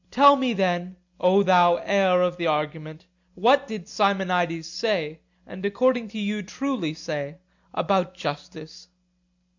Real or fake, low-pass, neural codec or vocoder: real; 7.2 kHz; none